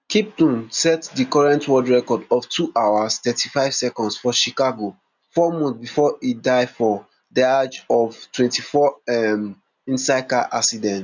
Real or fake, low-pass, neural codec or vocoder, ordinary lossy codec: real; 7.2 kHz; none; none